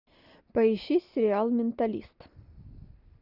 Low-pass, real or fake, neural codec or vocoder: 5.4 kHz; real; none